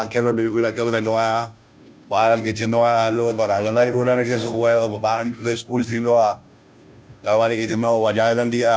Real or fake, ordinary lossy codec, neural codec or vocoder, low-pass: fake; none; codec, 16 kHz, 0.5 kbps, FunCodec, trained on Chinese and English, 25 frames a second; none